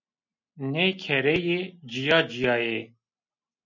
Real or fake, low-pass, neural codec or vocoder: real; 7.2 kHz; none